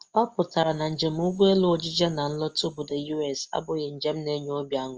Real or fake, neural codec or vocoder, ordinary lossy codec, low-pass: real; none; Opus, 24 kbps; 7.2 kHz